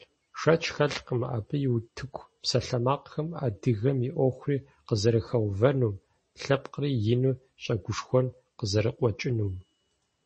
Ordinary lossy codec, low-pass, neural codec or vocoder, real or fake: MP3, 32 kbps; 10.8 kHz; none; real